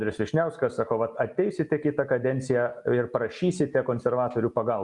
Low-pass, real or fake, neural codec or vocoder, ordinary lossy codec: 10.8 kHz; real; none; Opus, 64 kbps